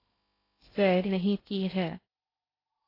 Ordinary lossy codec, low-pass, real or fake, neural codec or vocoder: AAC, 24 kbps; 5.4 kHz; fake; codec, 16 kHz in and 24 kHz out, 0.6 kbps, FocalCodec, streaming, 2048 codes